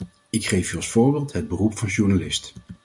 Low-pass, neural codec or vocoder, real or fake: 10.8 kHz; none; real